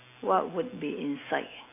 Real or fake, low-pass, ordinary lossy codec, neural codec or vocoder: real; 3.6 kHz; AAC, 24 kbps; none